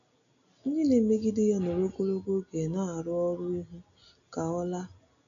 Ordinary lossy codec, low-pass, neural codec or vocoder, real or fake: none; 7.2 kHz; none; real